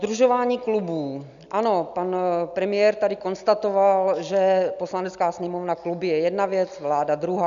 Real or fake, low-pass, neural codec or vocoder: real; 7.2 kHz; none